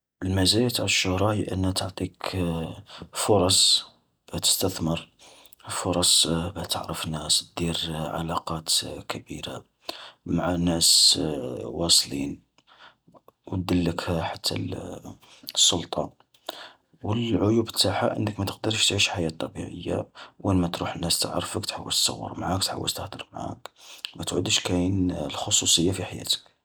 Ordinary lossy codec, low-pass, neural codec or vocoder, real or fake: none; none; none; real